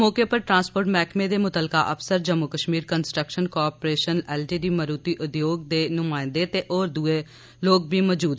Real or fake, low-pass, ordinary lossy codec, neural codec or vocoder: real; none; none; none